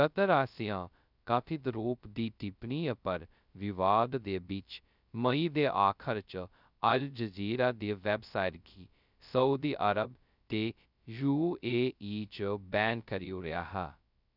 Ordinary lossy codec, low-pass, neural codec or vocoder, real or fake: none; 5.4 kHz; codec, 16 kHz, 0.2 kbps, FocalCodec; fake